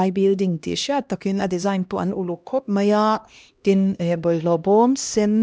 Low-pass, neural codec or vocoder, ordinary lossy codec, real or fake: none; codec, 16 kHz, 1 kbps, X-Codec, HuBERT features, trained on LibriSpeech; none; fake